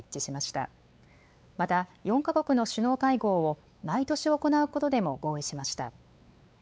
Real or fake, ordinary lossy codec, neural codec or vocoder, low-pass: fake; none; codec, 16 kHz, 4 kbps, X-Codec, WavLM features, trained on Multilingual LibriSpeech; none